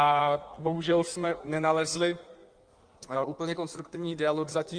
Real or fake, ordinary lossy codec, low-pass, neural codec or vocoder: fake; Opus, 32 kbps; 9.9 kHz; codec, 16 kHz in and 24 kHz out, 1.1 kbps, FireRedTTS-2 codec